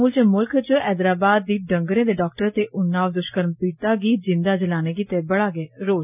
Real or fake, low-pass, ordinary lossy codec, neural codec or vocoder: real; 3.6 kHz; none; none